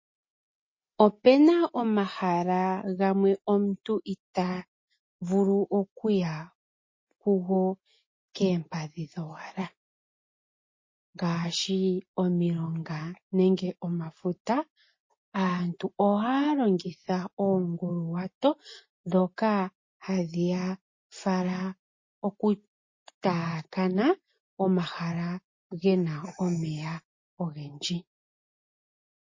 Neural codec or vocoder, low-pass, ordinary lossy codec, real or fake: none; 7.2 kHz; MP3, 32 kbps; real